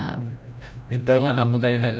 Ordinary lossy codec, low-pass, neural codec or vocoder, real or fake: none; none; codec, 16 kHz, 0.5 kbps, FreqCodec, larger model; fake